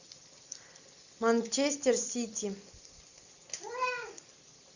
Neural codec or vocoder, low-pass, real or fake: none; 7.2 kHz; real